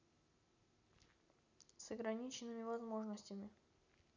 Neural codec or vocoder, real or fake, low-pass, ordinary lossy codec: none; real; 7.2 kHz; none